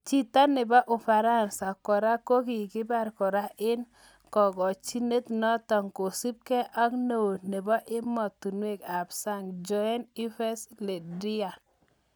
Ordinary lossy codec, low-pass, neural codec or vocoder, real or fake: none; none; none; real